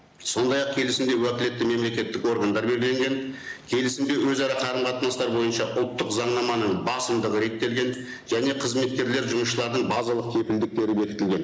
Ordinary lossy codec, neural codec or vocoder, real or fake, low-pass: none; none; real; none